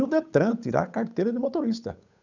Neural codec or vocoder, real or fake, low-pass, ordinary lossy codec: codec, 16 kHz, 8 kbps, FunCodec, trained on Chinese and English, 25 frames a second; fake; 7.2 kHz; none